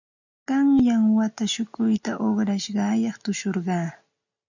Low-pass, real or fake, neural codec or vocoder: 7.2 kHz; real; none